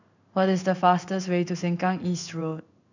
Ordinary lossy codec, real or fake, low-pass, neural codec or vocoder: none; fake; 7.2 kHz; codec, 16 kHz in and 24 kHz out, 1 kbps, XY-Tokenizer